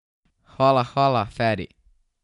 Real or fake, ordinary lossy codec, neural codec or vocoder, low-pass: real; none; none; 10.8 kHz